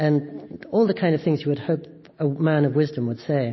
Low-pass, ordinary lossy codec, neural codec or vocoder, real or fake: 7.2 kHz; MP3, 24 kbps; none; real